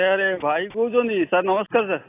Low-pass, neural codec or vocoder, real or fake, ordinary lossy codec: 3.6 kHz; none; real; AAC, 24 kbps